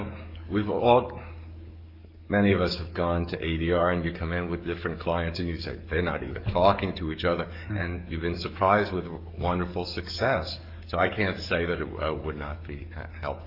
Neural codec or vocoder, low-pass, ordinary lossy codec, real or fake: codec, 44.1 kHz, 7.8 kbps, DAC; 5.4 kHz; Opus, 64 kbps; fake